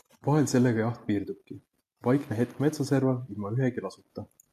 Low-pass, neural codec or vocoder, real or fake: 14.4 kHz; none; real